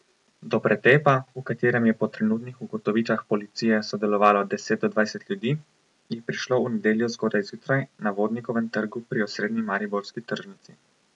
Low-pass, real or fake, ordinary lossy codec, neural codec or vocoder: 10.8 kHz; real; none; none